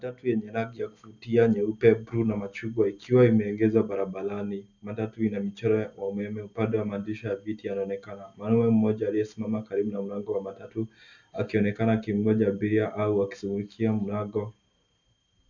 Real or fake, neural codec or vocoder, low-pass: real; none; 7.2 kHz